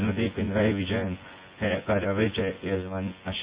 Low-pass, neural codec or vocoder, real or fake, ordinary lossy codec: 3.6 kHz; vocoder, 24 kHz, 100 mel bands, Vocos; fake; Opus, 64 kbps